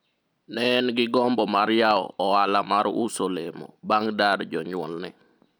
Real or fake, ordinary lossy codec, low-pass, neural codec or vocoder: real; none; none; none